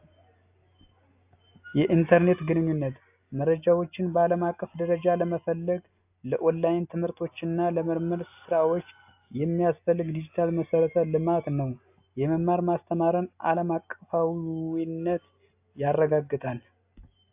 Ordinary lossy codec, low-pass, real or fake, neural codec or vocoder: AAC, 32 kbps; 3.6 kHz; real; none